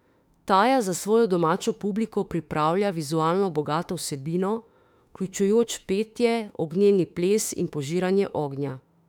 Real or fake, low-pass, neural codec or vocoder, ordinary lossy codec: fake; 19.8 kHz; autoencoder, 48 kHz, 32 numbers a frame, DAC-VAE, trained on Japanese speech; none